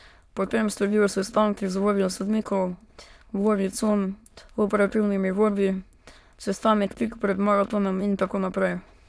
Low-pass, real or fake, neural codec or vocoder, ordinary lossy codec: none; fake; autoencoder, 22.05 kHz, a latent of 192 numbers a frame, VITS, trained on many speakers; none